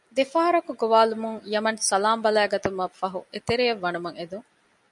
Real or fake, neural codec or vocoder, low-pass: real; none; 10.8 kHz